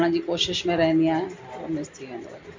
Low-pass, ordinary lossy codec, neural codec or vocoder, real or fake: 7.2 kHz; MP3, 48 kbps; none; real